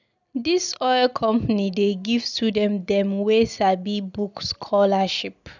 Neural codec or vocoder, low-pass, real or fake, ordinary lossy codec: none; 7.2 kHz; real; none